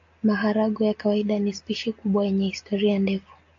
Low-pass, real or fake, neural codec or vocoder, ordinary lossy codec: 7.2 kHz; real; none; AAC, 48 kbps